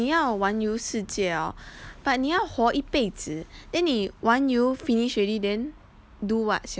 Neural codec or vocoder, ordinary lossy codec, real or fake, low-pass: none; none; real; none